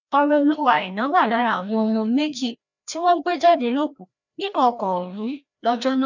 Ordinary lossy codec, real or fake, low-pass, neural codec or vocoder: none; fake; 7.2 kHz; codec, 16 kHz, 1 kbps, FreqCodec, larger model